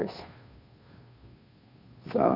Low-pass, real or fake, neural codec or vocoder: 5.4 kHz; fake; codec, 16 kHz, 2 kbps, FunCodec, trained on Chinese and English, 25 frames a second